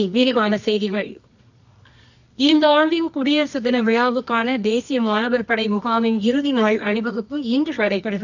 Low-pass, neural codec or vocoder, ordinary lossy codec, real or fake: 7.2 kHz; codec, 24 kHz, 0.9 kbps, WavTokenizer, medium music audio release; none; fake